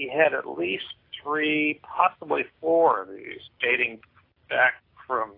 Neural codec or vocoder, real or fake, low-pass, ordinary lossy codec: none; real; 5.4 kHz; AAC, 32 kbps